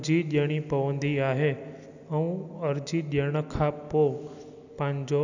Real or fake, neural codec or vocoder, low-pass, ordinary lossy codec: real; none; 7.2 kHz; none